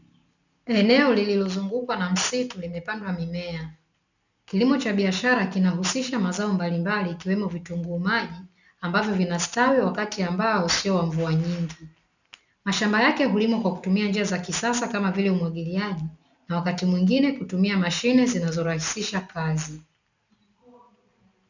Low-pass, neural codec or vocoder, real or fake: 7.2 kHz; none; real